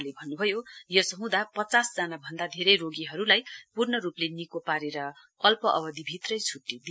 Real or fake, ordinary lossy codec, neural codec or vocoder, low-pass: real; none; none; none